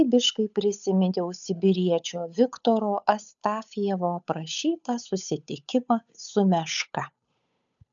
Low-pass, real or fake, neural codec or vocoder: 7.2 kHz; fake; codec, 16 kHz, 8 kbps, FunCodec, trained on Chinese and English, 25 frames a second